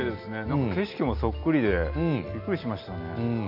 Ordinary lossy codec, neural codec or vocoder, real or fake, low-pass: none; none; real; 5.4 kHz